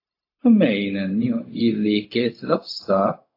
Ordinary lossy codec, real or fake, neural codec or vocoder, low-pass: AAC, 32 kbps; fake; codec, 16 kHz, 0.4 kbps, LongCat-Audio-Codec; 5.4 kHz